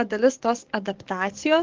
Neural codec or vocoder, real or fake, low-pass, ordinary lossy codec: codec, 44.1 kHz, 7.8 kbps, DAC; fake; 7.2 kHz; Opus, 16 kbps